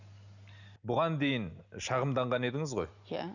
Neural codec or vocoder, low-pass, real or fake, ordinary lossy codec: none; 7.2 kHz; real; none